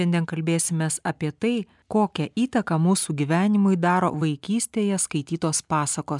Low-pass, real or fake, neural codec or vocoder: 10.8 kHz; real; none